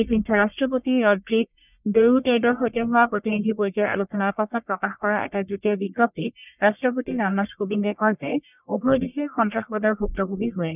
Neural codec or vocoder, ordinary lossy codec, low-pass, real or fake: codec, 44.1 kHz, 1.7 kbps, Pupu-Codec; none; 3.6 kHz; fake